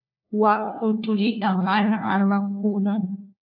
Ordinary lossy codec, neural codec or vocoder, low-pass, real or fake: AAC, 32 kbps; codec, 16 kHz, 1 kbps, FunCodec, trained on LibriTTS, 50 frames a second; 5.4 kHz; fake